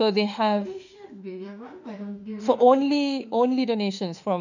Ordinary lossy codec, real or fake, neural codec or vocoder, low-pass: none; fake; autoencoder, 48 kHz, 32 numbers a frame, DAC-VAE, trained on Japanese speech; 7.2 kHz